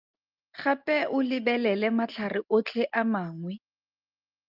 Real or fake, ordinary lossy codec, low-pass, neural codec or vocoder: real; Opus, 24 kbps; 5.4 kHz; none